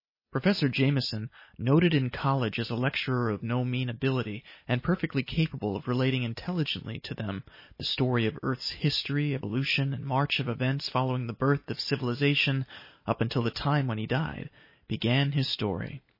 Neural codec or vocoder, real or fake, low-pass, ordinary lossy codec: none; real; 5.4 kHz; MP3, 24 kbps